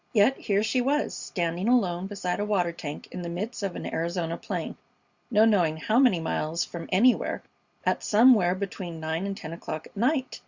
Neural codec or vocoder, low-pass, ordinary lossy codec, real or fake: none; 7.2 kHz; Opus, 64 kbps; real